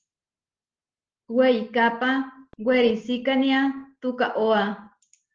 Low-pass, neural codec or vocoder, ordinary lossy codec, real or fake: 7.2 kHz; none; Opus, 16 kbps; real